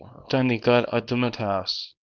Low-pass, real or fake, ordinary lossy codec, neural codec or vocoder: 7.2 kHz; fake; Opus, 24 kbps; codec, 24 kHz, 0.9 kbps, WavTokenizer, small release